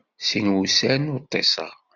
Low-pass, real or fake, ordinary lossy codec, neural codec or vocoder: 7.2 kHz; real; Opus, 64 kbps; none